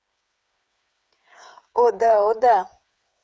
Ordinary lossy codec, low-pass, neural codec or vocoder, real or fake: none; none; codec, 16 kHz, 8 kbps, FreqCodec, smaller model; fake